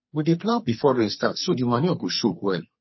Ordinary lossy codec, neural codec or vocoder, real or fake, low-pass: MP3, 24 kbps; codec, 44.1 kHz, 2.6 kbps, SNAC; fake; 7.2 kHz